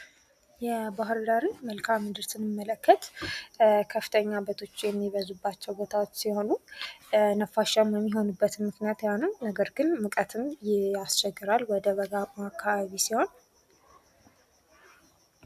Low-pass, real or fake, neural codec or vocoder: 14.4 kHz; real; none